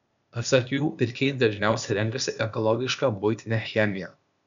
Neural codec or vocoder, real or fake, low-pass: codec, 16 kHz, 0.8 kbps, ZipCodec; fake; 7.2 kHz